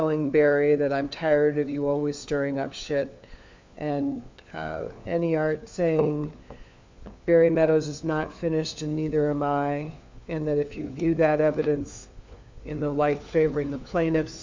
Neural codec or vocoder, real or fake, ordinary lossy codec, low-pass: codec, 16 kHz, 4 kbps, FunCodec, trained on LibriTTS, 50 frames a second; fake; MP3, 64 kbps; 7.2 kHz